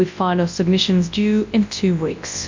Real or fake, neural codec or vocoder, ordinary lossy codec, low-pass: fake; codec, 24 kHz, 0.9 kbps, WavTokenizer, large speech release; MP3, 48 kbps; 7.2 kHz